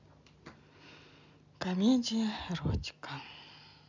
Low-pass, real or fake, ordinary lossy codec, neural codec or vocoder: 7.2 kHz; real; none; none